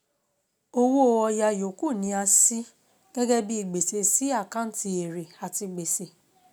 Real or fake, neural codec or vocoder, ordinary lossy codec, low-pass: real; none; none; none